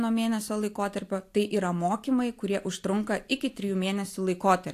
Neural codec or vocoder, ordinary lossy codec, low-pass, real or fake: none; AAC, 64 kbps; 14.4 kHz; real